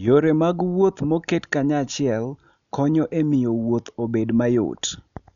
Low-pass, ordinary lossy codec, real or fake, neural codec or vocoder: 7.2 kHz; none; real; none